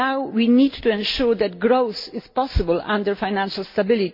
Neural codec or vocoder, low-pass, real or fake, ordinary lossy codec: none; 5.4 kHz; real; MP3, 32 kbps